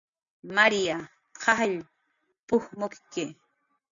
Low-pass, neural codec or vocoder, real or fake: 7.2 kHz; none; real